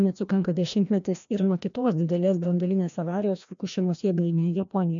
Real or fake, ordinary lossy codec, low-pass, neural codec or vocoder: fake; MP3, 64 kbps; 7.2 kHz; codec, 16 kHz, 1 kbps, FreqCodec, larger model